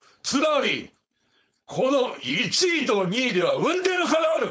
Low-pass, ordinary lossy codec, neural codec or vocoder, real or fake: none; none; codec, 16 kHz, 4.8 kbps, FACodec; fake